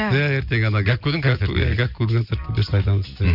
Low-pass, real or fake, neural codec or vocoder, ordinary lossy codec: 5.4 kHz; real; none; none